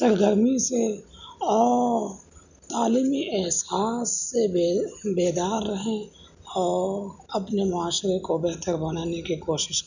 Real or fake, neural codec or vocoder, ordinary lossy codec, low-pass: real; none; none; 7.2 kHz